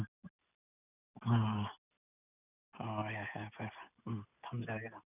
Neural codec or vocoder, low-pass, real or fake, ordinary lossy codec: none; 3.6 kHz; real; none